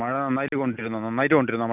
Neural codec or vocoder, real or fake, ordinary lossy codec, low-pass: none; real; none; 3.6 kHz